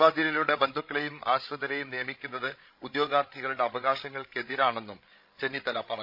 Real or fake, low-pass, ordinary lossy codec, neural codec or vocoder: fake; 5.4 kHz; none; codec, 16 kHz, 16 kbps, FreqCodec, larger model